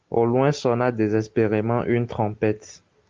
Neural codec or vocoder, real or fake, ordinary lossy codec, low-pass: none; real; Opus, 32 kbps; 7.2 kHz